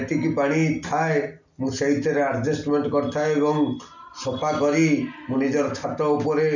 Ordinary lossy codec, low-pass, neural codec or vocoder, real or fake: none; 7.2 kHz; none; real